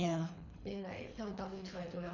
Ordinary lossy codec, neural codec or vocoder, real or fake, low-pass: none; codec, 24 kHz, 3 kbps, HILCodec; fake; 7.2 kHz